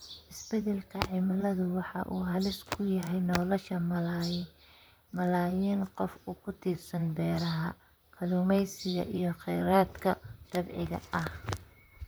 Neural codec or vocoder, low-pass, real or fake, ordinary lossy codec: vocoder, 44.1 kHz, 128 mel bands, Pupu-Vocoder; none; fake; none